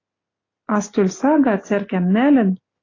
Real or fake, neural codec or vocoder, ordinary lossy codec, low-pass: real; none; AAC, 32 kbps; 7.2 kHz